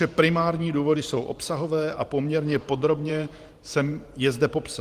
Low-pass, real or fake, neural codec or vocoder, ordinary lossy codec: 14.4 kHz; fake; vocoder, 44.1 kHz, 128 mel bands every 512 samples, BigVGAN v2; Opus, 24 kbps